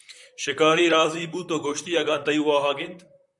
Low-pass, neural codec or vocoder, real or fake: 10.8 kHz; vocoder, 44.1 kHz, 128 mel bands, Pupu-Vocoder; fake